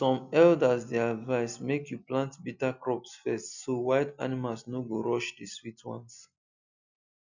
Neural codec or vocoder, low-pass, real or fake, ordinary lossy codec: none; 7.2 kHz; real; none